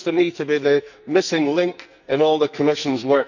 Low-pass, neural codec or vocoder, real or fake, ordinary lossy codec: 7.2 kHz; codec, 44.1 kHz, 2.6 kbps, SNAC; fake; none